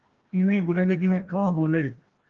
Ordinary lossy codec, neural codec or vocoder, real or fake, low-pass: Opus, 16 kbps; codec, 16 kHz, 1 kbps, FreqCodec, larger model; fake; 7.2 kHz